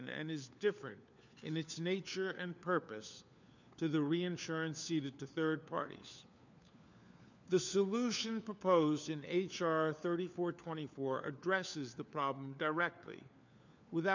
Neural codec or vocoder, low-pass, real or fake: codec, 16 kHz, 4 kbps, FunCodec, trained on LibriTTS, 50 frames a second; 7.2 kHz; fake